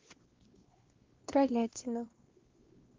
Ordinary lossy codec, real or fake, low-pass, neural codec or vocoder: Opus, 16 kbps; fake; 7.2 kHz; codec, 16 kHz, 2 kbps, X-Codec, WavLM features, trained on Multilingual LibriSpeech